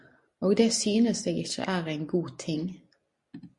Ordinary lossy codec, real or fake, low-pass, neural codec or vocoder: MP3, 64 kbps; real; 10.8 kHz; none